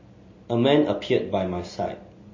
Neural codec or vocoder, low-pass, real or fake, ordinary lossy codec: none; 7.2 kHz; real; MP3, 32 kbps